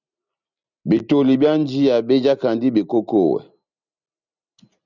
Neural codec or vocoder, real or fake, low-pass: none; real; 7.2 kHz